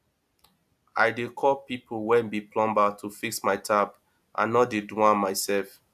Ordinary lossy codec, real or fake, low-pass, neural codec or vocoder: AAC, 96 kbps; real; 14.4 kHz; none